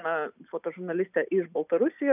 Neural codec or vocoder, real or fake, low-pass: none; real; 3.6 kHz